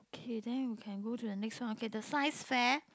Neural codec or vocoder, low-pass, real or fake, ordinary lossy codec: none; none; real; none